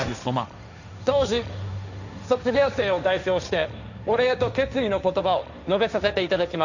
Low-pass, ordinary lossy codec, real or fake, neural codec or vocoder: 7.2 kHz; none; fake; codec, 16 kHz, 1.1 kbps, Voila-Tokenizer